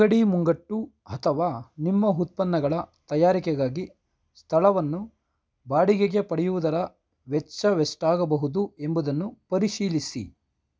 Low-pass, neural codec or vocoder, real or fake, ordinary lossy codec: none; none; real; none